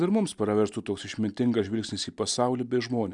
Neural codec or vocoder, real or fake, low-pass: none; real; 10.8 kHz